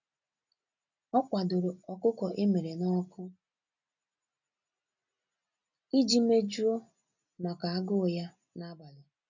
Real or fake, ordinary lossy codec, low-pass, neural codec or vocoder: real; none; 7.2 kHz; none